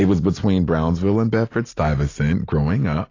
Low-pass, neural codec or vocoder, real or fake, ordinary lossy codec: 7.2 kHz; none; real; AAC, 32 kbps